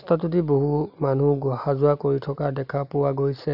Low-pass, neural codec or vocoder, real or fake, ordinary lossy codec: 5.4 kHz; none; real; none